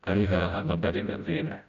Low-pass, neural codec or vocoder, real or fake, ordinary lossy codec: 7.2 kHz; codec, 16 kHz, 0.5 kbps, FreqCodec, smaller model; fake; none